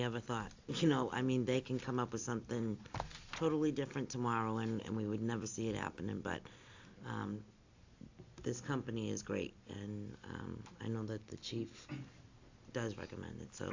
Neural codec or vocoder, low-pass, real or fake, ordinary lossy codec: none; 7.2 kHz; real; AAC, 48 kbps